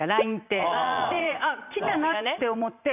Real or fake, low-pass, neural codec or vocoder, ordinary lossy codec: fake; 3.6 kHz; vocoder, 44.1 kHz, 80 mel bands, Vocos; none